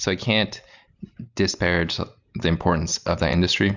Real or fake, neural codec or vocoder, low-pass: real; none; 7.2 kHz